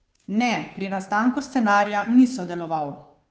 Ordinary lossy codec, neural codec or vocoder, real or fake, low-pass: none; codec, 16 kHz, 2 kbps, FunCodec, trained on Chinese and English, 25 frames a second; fake; none